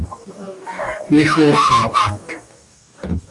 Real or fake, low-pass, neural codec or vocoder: fake; 10.8 kHz; codec, 44.1 kHz, 2.6 kbps, DAC